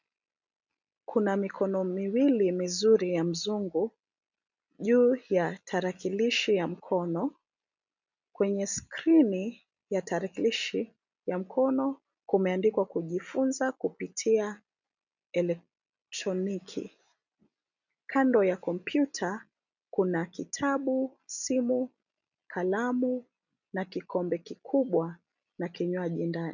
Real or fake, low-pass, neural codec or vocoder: real; 7.2 kHz; none